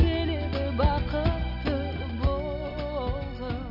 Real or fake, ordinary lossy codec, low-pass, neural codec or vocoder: real; none; 5.4 kHz; none